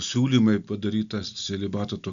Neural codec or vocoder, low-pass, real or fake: none; 7.2 kHz; real